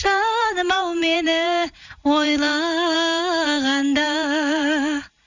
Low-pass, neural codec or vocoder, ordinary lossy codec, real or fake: 7.2 kHz; vocoder, 44.1 kHz, 128 mel bands every 512 samples, BigVGAN v2; none; fake